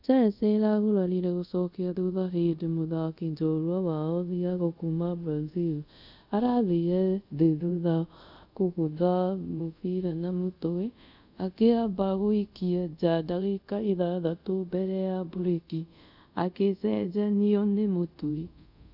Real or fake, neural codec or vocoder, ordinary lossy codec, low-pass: fake; codec, 24 kHz, 0.5 kbps, DualCodec; none; 5.4 kHz